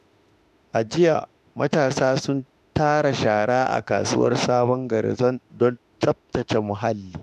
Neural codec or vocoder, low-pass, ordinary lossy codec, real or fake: autoencoder, 48 kHz, 32 numbers a frame, DAC-VAE, trained on Japanese speech; 14.4 kHz; none; fake